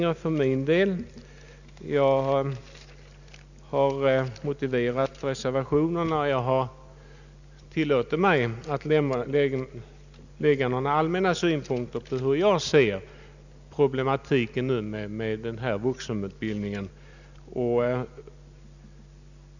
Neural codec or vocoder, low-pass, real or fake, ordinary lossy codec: none; 7.2 kHz; real; none